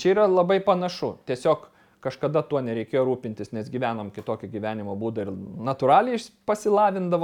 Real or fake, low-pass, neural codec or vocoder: real; 19.8 kHz; none